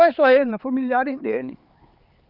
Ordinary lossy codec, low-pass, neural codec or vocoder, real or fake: Opus, 32 kbps; 5.4 kHz; codec, 16 kHz, 4 kbps, X-Codec, HuBERT features, trained on LibriSpeech; fake